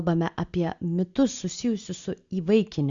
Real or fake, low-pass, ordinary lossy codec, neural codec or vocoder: real; 7.2 kHz; Opus, 64 kbps; none